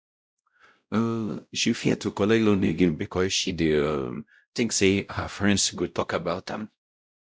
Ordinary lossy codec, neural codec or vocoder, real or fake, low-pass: none; codec, 16 kHz, 0.5 kbps, X-Codec, WavLM features, trained on Multilingual LibriSpeech; fake; none